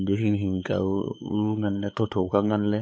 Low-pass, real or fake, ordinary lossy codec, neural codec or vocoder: none; fake; none; codec, 16 kHz, 4 kbps, X-Codec, WavLM features, trained on Multilingual LibriSpeech